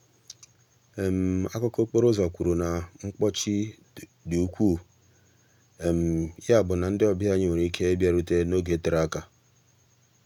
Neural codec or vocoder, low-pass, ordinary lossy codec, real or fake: none; 19.8 kHz; none; real